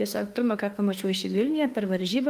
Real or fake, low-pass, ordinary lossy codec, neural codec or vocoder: fake; 14.4 kHz; Opus, 32 kbps; autoencoder, 48 kHz, 32 numbers a frame, DAC-VAE, trained on Japanese speech